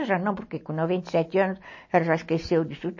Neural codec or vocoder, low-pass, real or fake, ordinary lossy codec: none; 7.2 kHz; real; MP3, 32 kbps